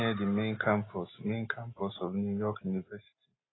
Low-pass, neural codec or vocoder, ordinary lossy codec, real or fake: 7.2 kHz; none; AAC, 16 kbps; real